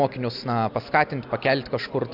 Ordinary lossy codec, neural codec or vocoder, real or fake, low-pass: AAC, 48 kbps; none; real; 5.4 kHz